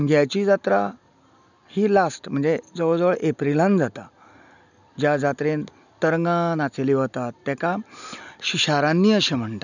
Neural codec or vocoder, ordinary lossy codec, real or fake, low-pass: none; none; real; 7.2 kHz